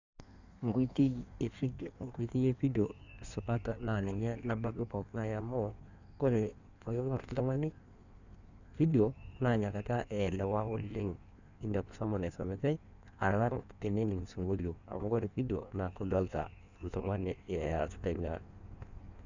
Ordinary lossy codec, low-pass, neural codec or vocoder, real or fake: none; 7.2 kHz; codec, 16 kHz in and 24 kHz out, 1.1 kbps, FireRedTTS-2 codec; fake